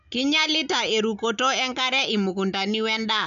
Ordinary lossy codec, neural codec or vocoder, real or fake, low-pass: none; none; real; 7.2 kHz